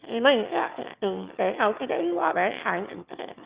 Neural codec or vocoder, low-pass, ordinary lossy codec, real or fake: autoencoder, 22.05 kHz, a latent of 192 numbers a frame, VITS, trained on one speaker; 3.6 kHz; Opus, 64 kbps; fake